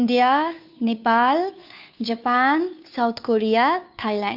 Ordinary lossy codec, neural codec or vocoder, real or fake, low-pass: none; codec, 16 kHz, 2 kbps, FunCodec, trained on Chinese and English, 25 frames a second; fake; 5.4 kHz